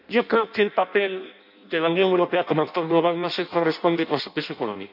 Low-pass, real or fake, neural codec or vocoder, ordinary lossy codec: 5.4 kHz; fake; codec, 16 kHz in and 24 kHz out, 0.6 kbps, FireRedTTS-2 codec; none